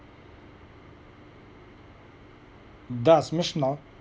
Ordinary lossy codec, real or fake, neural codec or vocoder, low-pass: none; real; none; none